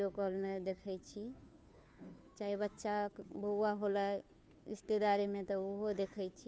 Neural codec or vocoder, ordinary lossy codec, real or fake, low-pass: codec, 16 kHz, 2 kbps, FunCodec, trained on Chinese and English, 25 frames a second; none; fake; none